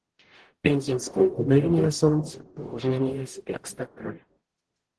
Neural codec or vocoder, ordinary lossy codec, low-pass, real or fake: codec, 44.1 kHz, 0.9 kbps, DAC; Opus, 16 kbps; 10.8 kHz; fake